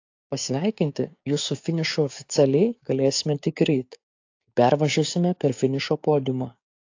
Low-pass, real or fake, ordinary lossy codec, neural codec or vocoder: 7.2 kHz; fake; AAC, 48 kbps; codec, 16 kHz, 6 kbps, DAC